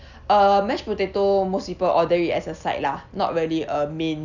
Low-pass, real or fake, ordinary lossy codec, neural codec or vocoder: 7.2 kHz; real; none; none